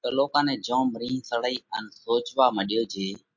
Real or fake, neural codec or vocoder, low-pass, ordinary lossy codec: real; none; 7.2 kHz; MP3, 48 kbps